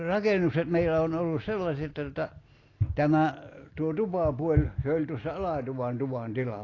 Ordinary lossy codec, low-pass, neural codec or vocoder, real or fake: AAC, 32 kbps; 7.2 kHz; none; real